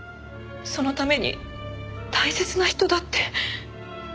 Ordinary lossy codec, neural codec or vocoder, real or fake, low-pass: none; none; real; none